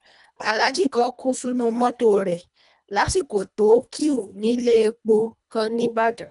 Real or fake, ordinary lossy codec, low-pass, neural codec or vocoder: fake; none; 10.8 kHz; codec, 24 kHz, 1.5 kbps, HILCodec